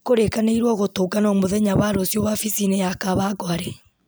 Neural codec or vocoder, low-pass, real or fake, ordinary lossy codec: none; none; real; none